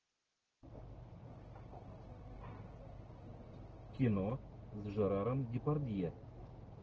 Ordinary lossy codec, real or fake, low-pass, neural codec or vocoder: Opus, 16 kbps; real; 7.2 kHz; none